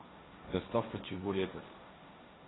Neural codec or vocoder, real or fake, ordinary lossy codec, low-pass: codec, 16 kHz, 1.1 kbps, Voila-Tokenizer; fake; AAC, 16 kbps; 7.2 kHz